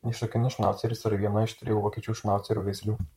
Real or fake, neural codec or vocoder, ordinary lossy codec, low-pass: fake; vocoder, 44.1 kHz, 128 mel bands, Pupu-Vocoder; MP3, 64 kbps; 19.8 kHz